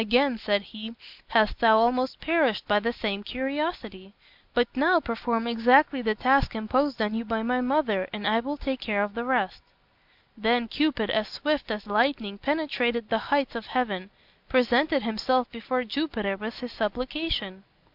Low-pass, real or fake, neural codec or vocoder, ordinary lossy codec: 5.4 kHz; real; none; MP3, 48 kbps